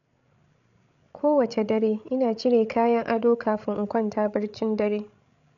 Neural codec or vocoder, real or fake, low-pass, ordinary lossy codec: codec, 16 kHz, 16 kbps, FreqCodec, larger model; fake; 7.2 kHz; none